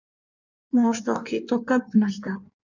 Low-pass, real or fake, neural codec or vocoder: 7.2 kHz; fake; codec, 16 kHz in and 24 kHz out, 1.1 kbps, FireRedTTS-2 codec